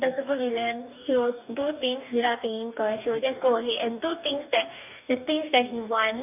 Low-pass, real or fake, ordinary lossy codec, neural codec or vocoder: 3.6 kHz; fake; none; codec, 44.1 kHz, 2.6 kbps, DAC